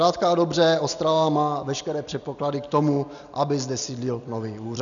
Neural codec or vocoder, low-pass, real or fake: none; 7.2 kHz; real